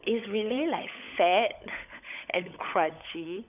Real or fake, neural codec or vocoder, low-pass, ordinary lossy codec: fake; codec, 16 kHz, 16 kbps, FunCodec, trained on LibriTTS, 50 frames a second; 3.6 kHz; none